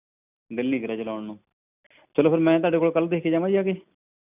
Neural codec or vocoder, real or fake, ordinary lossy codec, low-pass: none; real; none; 3.6 kHz